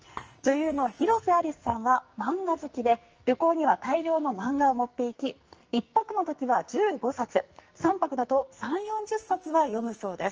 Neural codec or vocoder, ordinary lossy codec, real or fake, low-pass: codec, 44.1 kHz, 2.6 kbps, SNAC; Opus, 24 kbps; fake; 7.2 kHz